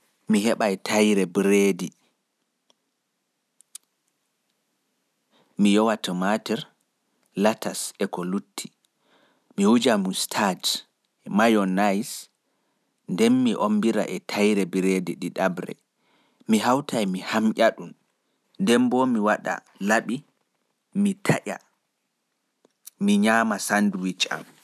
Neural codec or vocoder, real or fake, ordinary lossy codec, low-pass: none; real; none; 14.4 kHz